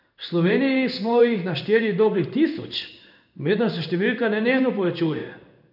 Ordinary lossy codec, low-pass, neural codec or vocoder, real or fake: none; 5.4 kHz; codec, 16 kHz in and 24 kHz out, 1 kbps, XY-Tokenizer; fake